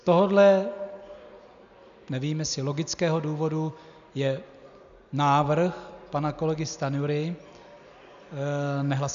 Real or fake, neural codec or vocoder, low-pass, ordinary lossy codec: real; none; 7.2 kHz; MP3, 96 kbps